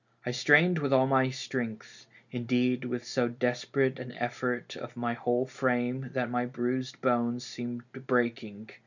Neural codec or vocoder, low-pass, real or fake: none; 7.2 kHz; real